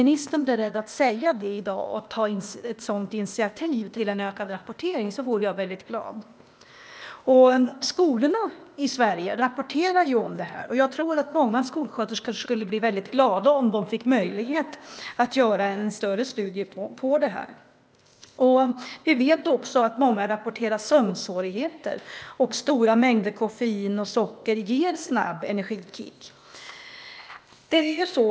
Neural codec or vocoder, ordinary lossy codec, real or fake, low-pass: codec, 16 kHz, 0.8 kbps, ZipCodec; none; fake; none